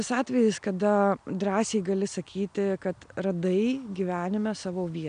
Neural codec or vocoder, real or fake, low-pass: none; real; 9.9 kHz